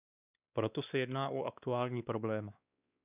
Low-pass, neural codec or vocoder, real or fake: 3.6 kHz; codec, 16 kHz, 2 kbps, X-Codec, WavLM features, trained on Multilingual LibriSpeech; fake